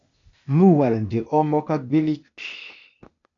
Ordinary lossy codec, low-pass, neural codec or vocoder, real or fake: MP3, 64 kbps; 7.2 kHz; codec, 16 kHz, 0.8 kbps, ZipCodec; fake